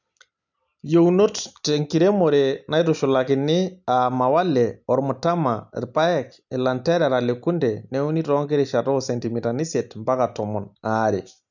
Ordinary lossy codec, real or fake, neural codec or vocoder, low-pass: none; real; none; 7.2 kHz